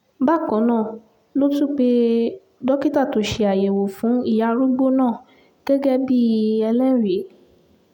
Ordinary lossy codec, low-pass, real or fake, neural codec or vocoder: none; 19.8 kHz; real; none